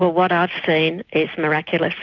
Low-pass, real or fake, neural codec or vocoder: 7.2 kHz; real; none